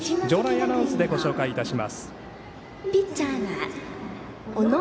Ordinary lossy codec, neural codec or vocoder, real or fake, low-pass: none; none; real; none